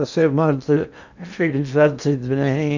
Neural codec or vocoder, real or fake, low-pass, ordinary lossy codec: codec, 16 kHz in and 24 kHz out, 0.8 kbps, FocalCodec, streaming, 65536 codes; fake; 7.2 kHz; none